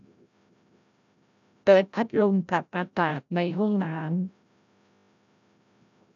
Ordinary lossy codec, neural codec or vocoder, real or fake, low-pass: none; codec, 16 kHz, 0.5 kbps, FreqCodec, larger model; fake; 7.2 kHz